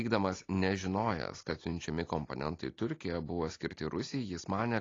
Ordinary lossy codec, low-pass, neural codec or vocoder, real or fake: AAC, 32 kbps; 7.2 kHz; none; real